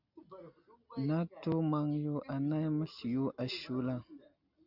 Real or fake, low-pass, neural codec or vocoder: real; 5.4 kHz; none